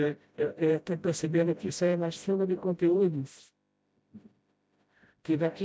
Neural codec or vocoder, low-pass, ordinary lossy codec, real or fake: codec, 16 kHz, 0.5 kbps, FreqCodec, smaller model; none; none; fake